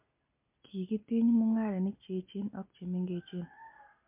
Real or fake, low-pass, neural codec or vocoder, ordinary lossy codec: real; 3.6 kHz; none; MP3, 32 kbps